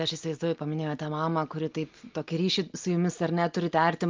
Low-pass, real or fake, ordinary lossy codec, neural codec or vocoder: 7.2 kHz; real; Opus, 32 kbps; none